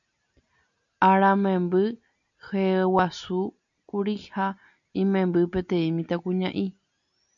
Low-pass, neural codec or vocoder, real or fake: 7.2 kHz; none; real